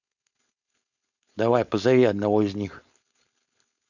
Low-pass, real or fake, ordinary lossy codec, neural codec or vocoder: 7.2 kHz; fake; none; codec, 16 kHz, 4.8 kbps, FACodec